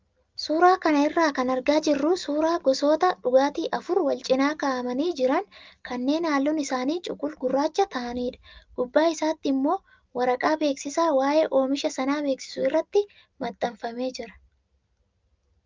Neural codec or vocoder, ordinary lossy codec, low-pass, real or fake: none; Opus, 24 kbps; 7.2 kHz; real